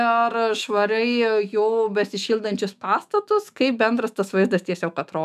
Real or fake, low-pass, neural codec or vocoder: fake; 14.4 kHz; autoencoder, 48 kHz, 128 numbers a frame, DAC-VAE, trained on Japanese speech